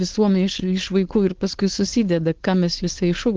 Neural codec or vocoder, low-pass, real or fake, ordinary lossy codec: codec, 16 kHz, 4.8 kbps, FACodec; 7.2 kHz; fake; Opus, 16 kbps